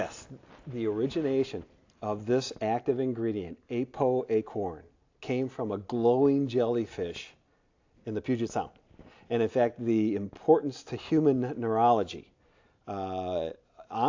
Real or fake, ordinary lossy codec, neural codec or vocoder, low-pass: real; AAC, 48 kbps; none; 7.2 kHz